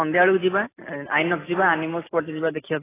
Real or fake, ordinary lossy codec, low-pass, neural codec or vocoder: real; AAC, 16 kbps; 3.6 kHz; none